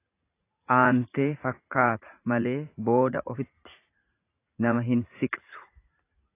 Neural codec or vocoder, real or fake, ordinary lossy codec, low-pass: vocoder, 44.1 kHz, 80 mel bands, Vocos; fake; AAC, 24 kbps; 3.6 kHz